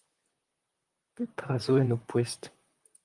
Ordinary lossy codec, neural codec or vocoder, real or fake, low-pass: Opus, 32 kbps; vocoder, 44.1 kHz, 128 mel bands, Pupu-Vocoder; fake; 10.8 kHz